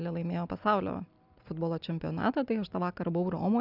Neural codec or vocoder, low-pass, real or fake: none; 5.4 kHz; real